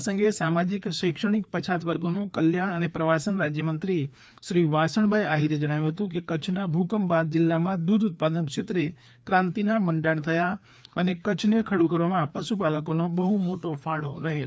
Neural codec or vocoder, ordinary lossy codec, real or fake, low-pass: codec, 16 kHz, 2 kbps, FreqCodec, larger model; none; fake; none